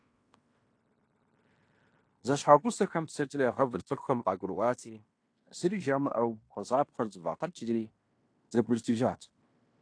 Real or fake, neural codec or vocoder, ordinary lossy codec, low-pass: fake; codec, 16 kHz in and 24 kHz out, 0.9 kbps, LongCat-Audio-Codec, fine tuned four codebook decoder; AAC, 48 kbps; 9.9 kHz